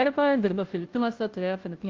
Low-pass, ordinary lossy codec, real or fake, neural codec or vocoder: 7.2 kHz; Opus, 32 kbps; fake; codec, 16 kHz, 0.5 kbps, FunCodec, trained on Chinese and English, 25 frames a second